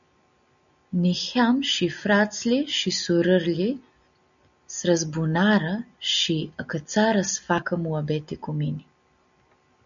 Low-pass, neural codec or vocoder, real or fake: 7.2 kHz; none; real